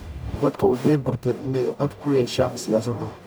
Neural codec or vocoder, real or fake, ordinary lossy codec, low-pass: codec, 44.1 kHz, 0.9 kbps, DAC; fake; none; none